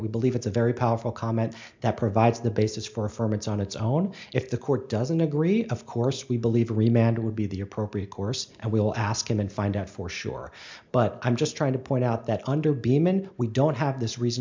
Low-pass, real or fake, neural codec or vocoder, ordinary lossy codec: 7.2 kHz; real; none; MP3, 64 kbps